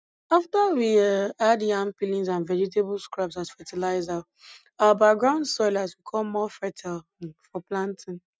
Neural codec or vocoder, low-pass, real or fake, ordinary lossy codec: none; none; real; none